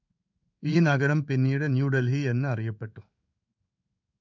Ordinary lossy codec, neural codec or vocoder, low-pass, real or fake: MP3, 64 kbps; codec, 16 kHz in and 24 kHz out, 1 kbps, XY-Tokenizer; 7.2 kHz; fake